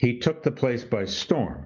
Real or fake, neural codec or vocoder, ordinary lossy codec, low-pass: real; none; MP3, 64 kbps; 7.2 kHz